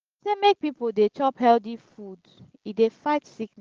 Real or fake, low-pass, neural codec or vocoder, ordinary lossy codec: real; 7.2 kHz; none; Opus, 16 kbps